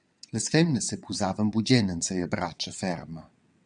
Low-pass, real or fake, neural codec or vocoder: 9.9 kHz; fake; vocoder, 22.05 kHz, 80 mel bands, WaveNeXt